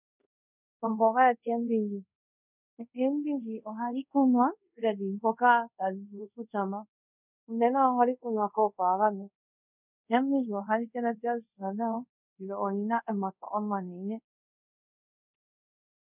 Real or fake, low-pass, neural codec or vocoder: fake; 3.6 kHz; codec, 24 kHz, 0.5 kbps, DualCodec